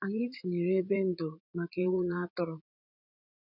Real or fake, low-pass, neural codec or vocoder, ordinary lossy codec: fake; 5.4 kHz; vocoder, 44.1 kHz, 80 mel bands, Vocos; none